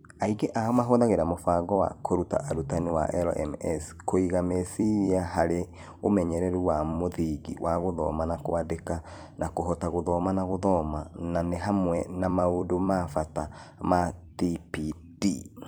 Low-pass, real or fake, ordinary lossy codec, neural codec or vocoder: none; fake; none; vocoder, 44.1 kHz, 128 mel bands every 256 samples, BigVGAN v2